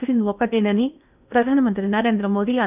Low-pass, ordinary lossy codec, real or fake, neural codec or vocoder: 3.6 kHz; none; fake; codec, 16 kHz in and 24 kHz out, 0.8 kbps, FocalCodec, streaming, 65536 codes